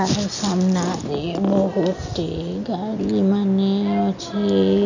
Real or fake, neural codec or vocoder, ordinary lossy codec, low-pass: real; none; none; 7.2 kHz